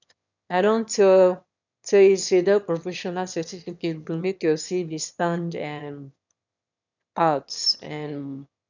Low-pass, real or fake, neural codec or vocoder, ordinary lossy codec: 7.2 kHz; fake; autoencoder, 22.05 kHz, a latent of 192 numbers a frame, VITS, trained on one speaker; none